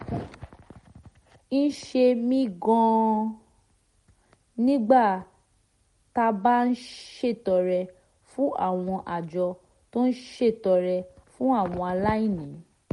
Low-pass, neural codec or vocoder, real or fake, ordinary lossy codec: 19.8 kHz; none; real; MP3, 48 kbps